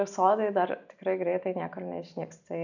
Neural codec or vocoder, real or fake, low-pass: none; real; 7.2 kHz